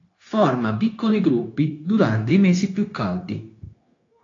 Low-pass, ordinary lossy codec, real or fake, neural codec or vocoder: 7.2 kHz; AAC, 32 kbps; fake; codec, 16 kHz, 0.9 kbps, LongCat-Audio-Codec